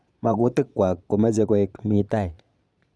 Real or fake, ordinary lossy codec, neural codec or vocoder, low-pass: fake; none; vocoder, 22.05 kHz, 80 mel bands, Vocos; none